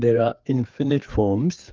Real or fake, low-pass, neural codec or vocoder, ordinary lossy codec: fake; 7.2 kHz; codec, 16 kHz, 4 kbps, FreqCodec, larger model; Opus, 32 kbps